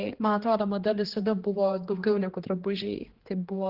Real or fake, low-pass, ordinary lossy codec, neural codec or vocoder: fake; 5.4 kHz; Opus, 16 kbps; codec, 16 kHz, 2 kbps, X-Codec, HuBERT features, trained on general audio